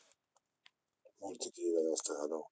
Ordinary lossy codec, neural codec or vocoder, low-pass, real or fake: none; none; none; real